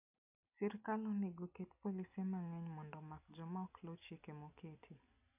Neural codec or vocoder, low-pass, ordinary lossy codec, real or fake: none; 3.6 kHz; none; real